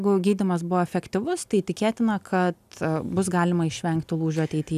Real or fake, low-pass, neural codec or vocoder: real; 14.4 kHz; none